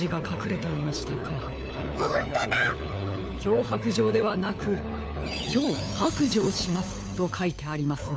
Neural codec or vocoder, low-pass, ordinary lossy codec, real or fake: codec, 16 kHz, 4 kbps, FunCodec, trained on LibriTTS, 50 frames a second; none; none; fake